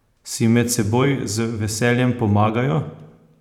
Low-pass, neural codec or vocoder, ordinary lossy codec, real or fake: 19.8 kHz; vocoder, 44.1 kHz, 128 mel bands every 512 samples, BigVGAN v2; none; fake